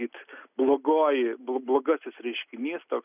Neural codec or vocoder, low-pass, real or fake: none; 3.6 kHz; real